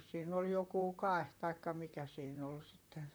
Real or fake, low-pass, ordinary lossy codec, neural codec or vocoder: fake; none; none; vocoder, 44.1 kHz, 128 mel bands, Pupu-Vocoder